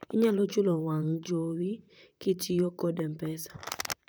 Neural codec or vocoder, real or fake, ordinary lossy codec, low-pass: vocoder, 44.1 kHz, 128 mel bands, Pupu-Vocoder; fake; none; none